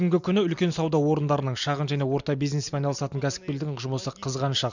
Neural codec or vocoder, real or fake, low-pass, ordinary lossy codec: none; real; 7.2 kHz; none